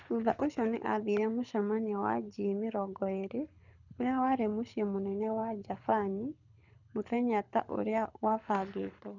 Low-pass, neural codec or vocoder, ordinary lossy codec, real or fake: 7.2 kHz; codec, 24 kHz, 6 kbps, HILCodec; none; fake